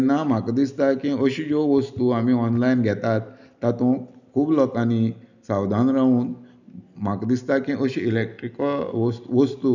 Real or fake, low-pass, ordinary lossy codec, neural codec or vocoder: real; 7.2 kHz; none; none